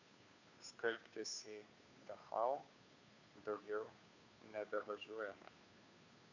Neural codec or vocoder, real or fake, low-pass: codec, 16 kHz, 2 kbps, FunCodec, trained on Chinese and English, 25 frames a second; fake; 7.2 kHz